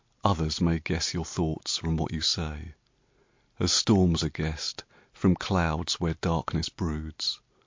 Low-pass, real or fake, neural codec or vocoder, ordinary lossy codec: 7.2 kHz; real; none; MP3, 48 kbps